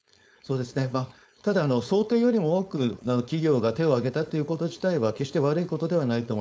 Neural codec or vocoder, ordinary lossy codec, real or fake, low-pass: codec, 16 kHz, 4.8 kbps, FACodec; none; fake; none